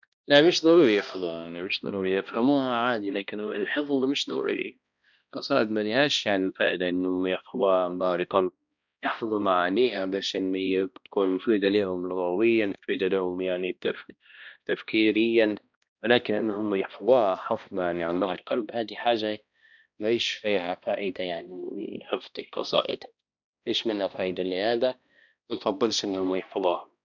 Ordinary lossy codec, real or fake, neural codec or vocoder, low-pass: none; fake; codec, 16 kHz, 1 kbps, X-Codec, HuBERT features, trained on balanced general audio; 7.2 kHz